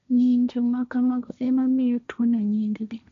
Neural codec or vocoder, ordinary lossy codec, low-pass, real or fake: codec, 16 kHz, 1.1 kbps, Voila-Tokenizer; none; 7.2 kHz; fake